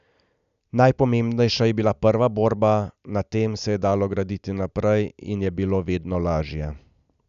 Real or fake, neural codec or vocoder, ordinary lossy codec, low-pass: real; none; none; 7.2 kHz